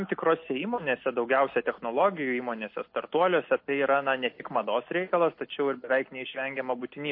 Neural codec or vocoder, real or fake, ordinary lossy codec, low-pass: none; real; MP3, 32 kbps; 5.4 kHz